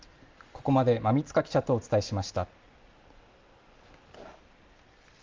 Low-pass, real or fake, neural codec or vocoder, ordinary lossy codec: 7.2 kHz; real; none; Opus, 32 kbps